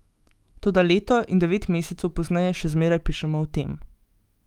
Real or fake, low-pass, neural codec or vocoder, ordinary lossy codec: fake; 19.8 kHz; autoencoder, 48 kHz, 128 numbers a frame, DAC-VAE, trained on Japanese speech; Opus, 32 kbps